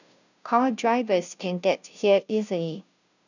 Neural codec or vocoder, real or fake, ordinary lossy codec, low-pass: codec, 16 kHz, 0.5 kbps, FunCodec, trained on Chinese and English, 25 frames a second; fake; none; 7.2 kHz